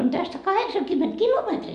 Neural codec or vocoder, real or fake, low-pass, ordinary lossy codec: none; real; 10.8 kHz; MP3, 96 kbps